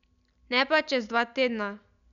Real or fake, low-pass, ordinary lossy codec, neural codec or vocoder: real; 7.2 kHz; none; none